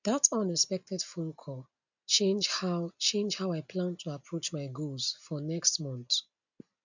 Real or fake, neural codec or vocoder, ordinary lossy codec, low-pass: real; none; none; 7.2 kHz